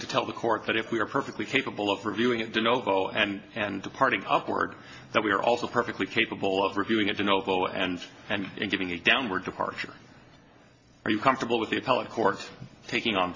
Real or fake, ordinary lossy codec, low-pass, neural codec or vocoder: real; MP3, 32 kbps; 7.2 kHz; none